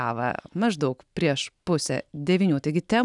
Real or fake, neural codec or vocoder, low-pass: real; none; 10.8 kHz